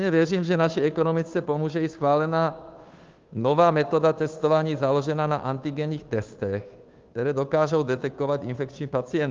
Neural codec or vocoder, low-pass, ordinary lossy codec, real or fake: codec, 16 kHz, 2 kbps, FunCodec, trained on Chinese and English, 25 frames a second; 7.2 kHz; Opus, 32 kbps; fake